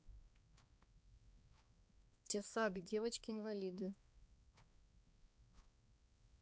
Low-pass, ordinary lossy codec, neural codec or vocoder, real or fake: none; none; codec, 16 kHz, 2 kbps, X-Codec, HuBERT features, trained on balanced general audio; fake